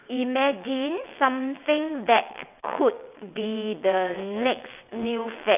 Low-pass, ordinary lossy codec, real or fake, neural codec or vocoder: 3.6 kHz; none; fake; vocoder, 22.05 kHz, 80 mel bands, WaveNeXt